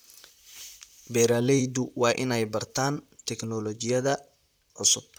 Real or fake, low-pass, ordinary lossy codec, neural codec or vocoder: fake; none; none; vocoder, 44.1 kHz, 128 mel bands, Pupu-Vocoder